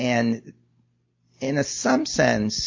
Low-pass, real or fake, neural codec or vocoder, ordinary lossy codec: 7.2 kHz; real; none; MP3, 48 kbps